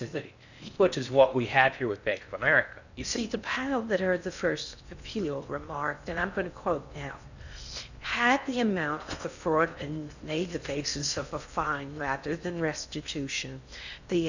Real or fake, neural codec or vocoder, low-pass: fake; codec, 16 kHz in and 24 kHz out, 0.6 kbps, FocalCodec, streaming, 4096 codes; 7.2 kHz